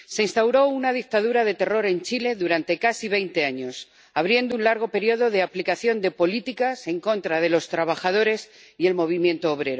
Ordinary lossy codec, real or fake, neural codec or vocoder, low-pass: none; real; none; none